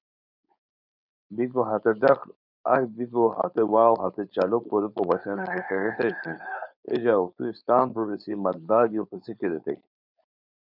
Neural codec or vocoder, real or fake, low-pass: codec, 16 kHz, 4.8 kbps, FACodec; fake; 5.4 kHz